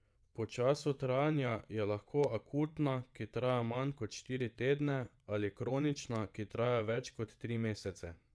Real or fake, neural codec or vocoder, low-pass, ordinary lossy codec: fake; vocoder, 22.05 kHz, 80 mel bands, WaveNeXt; none; none